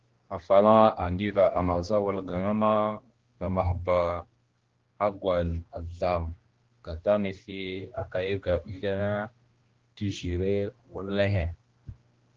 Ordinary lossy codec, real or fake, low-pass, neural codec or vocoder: Opus, 16 kbps; fake; 7.2 kHz; codec, 16 kHz, 1 kbps, X-Codec, HuBERT features, trained on general audio